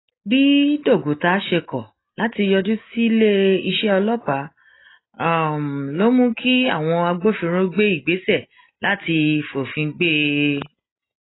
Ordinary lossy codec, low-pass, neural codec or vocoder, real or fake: AAC, 16 kbps; 7.2 kHz; none; real